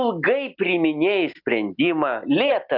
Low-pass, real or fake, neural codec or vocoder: 5.4 kHz; real; none